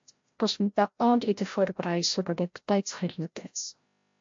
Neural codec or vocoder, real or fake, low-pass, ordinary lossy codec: codec, 16 kHz, 0.5 kbps, FreqCodec, larger model; fake; 7.2 kHz; MP3, 48 kbps